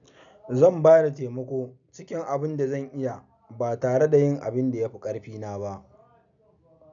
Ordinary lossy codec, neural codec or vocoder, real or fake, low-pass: none; none; real; 7.2 kHz